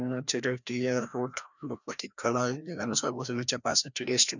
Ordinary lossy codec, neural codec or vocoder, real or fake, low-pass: none; codec, 16 kHz, 1 kbps, FreqCodec, larger model; fake; 7.2 kHz